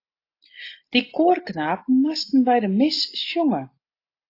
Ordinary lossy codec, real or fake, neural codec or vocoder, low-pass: AAC, 32 kbps; real; none; 5.4 kHz